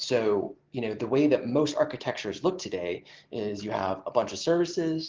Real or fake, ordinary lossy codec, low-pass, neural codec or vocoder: real; Opus, 16 kbps; 7.2 kHz; none